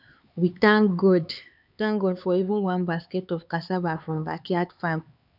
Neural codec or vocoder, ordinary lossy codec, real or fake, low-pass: codec, 16 kHz, 4 kbps, X-Codec, HuBERT features, trained on LibriSpeech; none; fake; 5.4 kHz